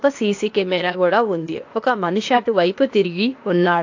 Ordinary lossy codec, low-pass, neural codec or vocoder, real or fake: AAC, 48 kbps; 7.2 kHz; codec, 16 kHz, 0.8 kbps, ZipCodec; fake